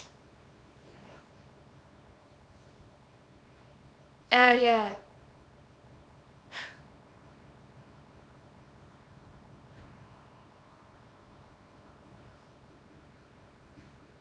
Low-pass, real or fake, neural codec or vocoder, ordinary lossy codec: 9.9 kHz; fake; codec, 24 kHz, 0.9 kbps, WavTokenizer, small release; none